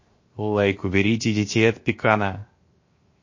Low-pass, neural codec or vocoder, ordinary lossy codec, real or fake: 7.2 kHz; codec, 16 kHz, 0.7 kbps, FocalCodec; MP3, 32 kbps; fake